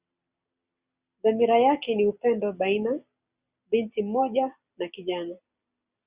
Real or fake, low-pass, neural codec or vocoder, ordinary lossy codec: real; 3.6 kHz; none; Opus, 64 kbps